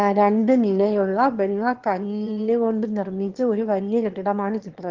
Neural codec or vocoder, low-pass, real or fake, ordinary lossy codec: autoencoder, 22.05 kHz, a latent of 192 numbers a frame, VITS, trained on one speaker; 7.2 kHz; fake; Opus, 16 kbps